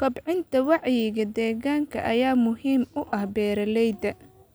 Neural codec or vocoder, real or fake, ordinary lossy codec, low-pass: none; real; none; none